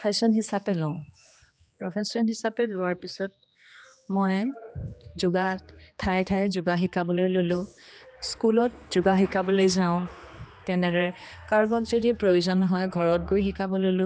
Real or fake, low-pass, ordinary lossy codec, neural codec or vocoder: fake; none; none; codec, 16 kHz, 2 kbps, X-Codec, HuBERT features, trained on general audio